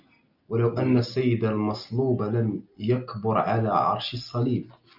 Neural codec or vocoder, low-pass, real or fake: none; 5.4 kHz; real